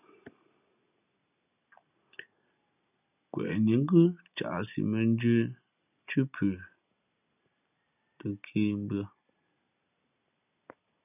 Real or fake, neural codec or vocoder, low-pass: real; none; 3.6 kHz